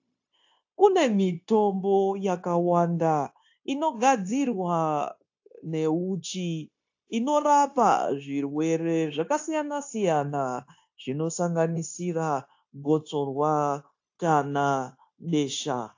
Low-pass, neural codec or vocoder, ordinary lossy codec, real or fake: 7.2 kHz; codec, 16 kHz, 0.9 kbps, LongCat-Audio-Codec; AAC, 48 kbps; fake